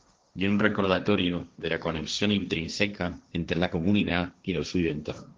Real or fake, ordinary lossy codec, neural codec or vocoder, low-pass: fake; Opus, 32 kbps; codec, 16 kHz, 1.1 kbps, Voila-Tokenizer; 7.2 kHz